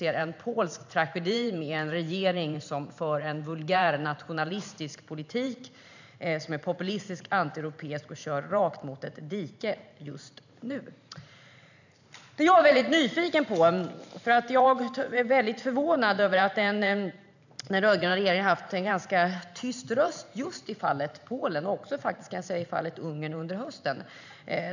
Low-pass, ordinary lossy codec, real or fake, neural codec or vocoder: 7.2 kHz; none; fake; vocoder, 44.1 kHz, 128 mel bands every 512 samples, BigVGAN v2